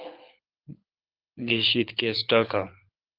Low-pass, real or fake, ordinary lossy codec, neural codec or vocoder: 5.4 kHz; fake; Opus, 24 kbps; codec, 24 kHz, 6 kbps, HILCodec